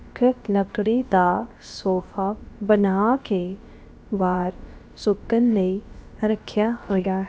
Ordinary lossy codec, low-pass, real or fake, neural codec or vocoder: none; none; fake; codec, 16 kHz, about 1 kbps, DyCAST, with the encoder's durations